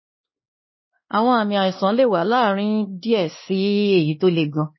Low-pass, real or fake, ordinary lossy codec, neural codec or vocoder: 7.2 kHz; fake; MP3, 24 kbps; codec, 16 kHz, 2 kbps, X-Codec, WavLM features, trained on Multilingual LibriSpeech